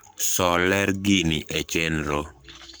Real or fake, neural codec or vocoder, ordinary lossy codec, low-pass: fake; codec, 44.1 kHz, 7.8 kbps, Pupu-Codec; none; none